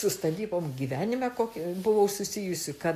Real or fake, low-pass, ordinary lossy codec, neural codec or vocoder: real; 14.4 kHz; AAC, 64 kbps; none